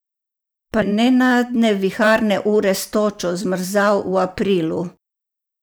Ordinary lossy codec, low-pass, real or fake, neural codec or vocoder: none; none; fake; vocoder, 44.1 kHz, 128 mel bands every 512 samples, BigVGAN v2